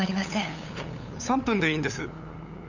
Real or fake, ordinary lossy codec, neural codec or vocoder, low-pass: fake; none; codec, 16 kHz, 8 kbps, FunCodec, trained on LibriTTS, 25 frames a second; 7.2 kHz